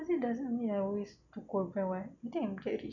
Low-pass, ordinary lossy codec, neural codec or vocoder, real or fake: 7.2 kHz; none; none; real